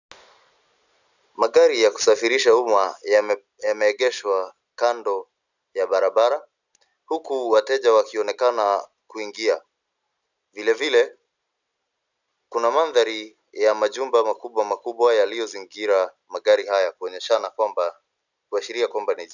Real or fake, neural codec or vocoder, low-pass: real; none; 7.2 kHz